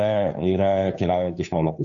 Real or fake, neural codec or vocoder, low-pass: fake; codec, 16 kHz, 2 kbps, FunCodec, trained on Chinese and English, 25 frames a second; 7.2 kHz